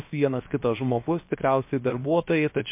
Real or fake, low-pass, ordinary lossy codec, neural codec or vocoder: fake; 3.6 kHz; MP3, 24 kbps; codec, 16 kHz, 0.7 kbps, FocalCodec